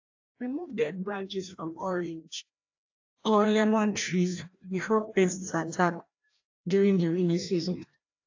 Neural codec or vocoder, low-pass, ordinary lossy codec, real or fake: codec, 16 kHz, 1 kbps, FreqCodec, larger model; 7.2 kHz; AAC, 48 kbps; fake